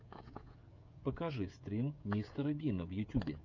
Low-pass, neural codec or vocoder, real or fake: 7.2 kHz; codec, 16 kHz, 16 kbps, FreqCodec, smaller model; fake